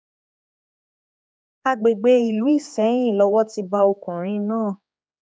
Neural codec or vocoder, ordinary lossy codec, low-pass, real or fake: codec, 16 kHz, 4 kbps, X-Codec, HuBERT features, trained on general audio; none; none; fake